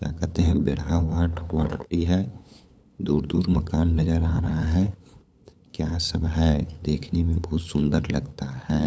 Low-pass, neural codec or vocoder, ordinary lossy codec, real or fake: none; codec, 16 kHz, 8 kbps, FunCodec, trained on LibriTTS, 25 frames a second; none; fake